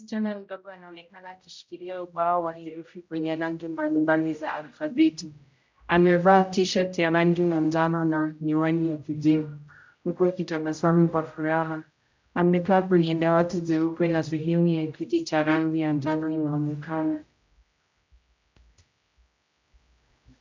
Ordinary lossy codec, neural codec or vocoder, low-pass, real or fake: MP3, 64 kbps; codec, 16 kHz, 0.5 kbps, X-Codec, HuBERT features, trained on general audio; 7.2 kHz; fake